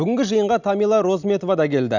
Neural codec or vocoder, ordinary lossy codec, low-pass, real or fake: none; none; 7.2 kHz; real